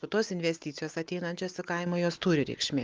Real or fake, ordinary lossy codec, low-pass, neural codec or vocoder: real; Opus, 32 kbps; 7.2 kHz; none